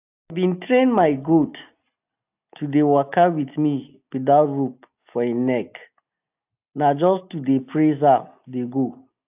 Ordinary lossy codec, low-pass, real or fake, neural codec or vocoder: AAC, 32 kbps; 3.6 kHz; real; none